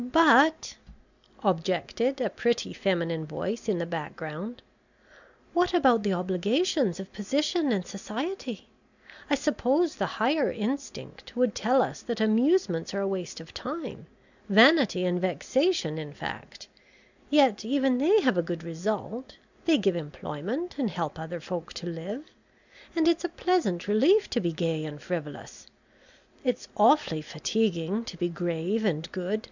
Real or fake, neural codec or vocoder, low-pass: real; none; 7.2 kHz